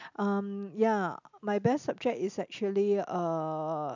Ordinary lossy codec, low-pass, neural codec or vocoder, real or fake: none; 7.2 kHz; none; real